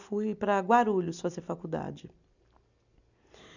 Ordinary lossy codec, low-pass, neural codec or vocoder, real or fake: none; 7.2 kHz; none; real